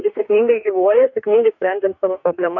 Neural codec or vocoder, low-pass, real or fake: codec, 44.1 kHz, 2.6 kbps, DAC; 7.2 kHz; fake